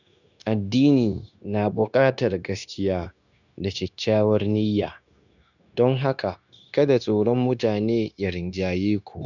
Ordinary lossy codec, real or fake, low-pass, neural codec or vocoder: none; fake; 7.2 kHz; codec, 16 kHz, 0.9 kbps, LongCat-Audio-Codec